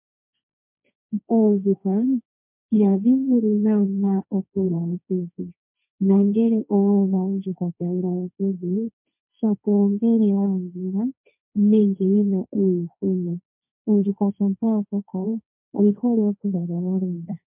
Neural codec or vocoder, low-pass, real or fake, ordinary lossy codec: codec, 16 kHz, 1.1 kbps, Voila-Tokenizer; 3.6 kHz; fake; MP3, 32 kbps